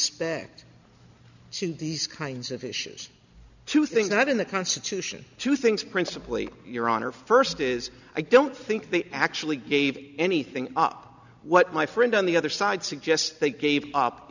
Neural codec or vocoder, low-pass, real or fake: none; 7.2 kHz; real